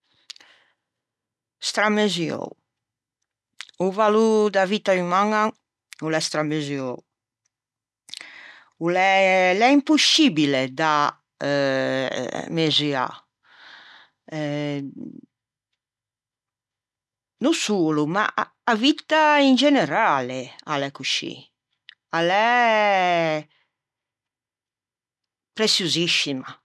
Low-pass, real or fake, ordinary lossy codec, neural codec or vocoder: none; real; none; none